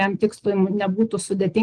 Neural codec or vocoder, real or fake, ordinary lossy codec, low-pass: none; real; Opus, 16 kbps; 9.9 kHz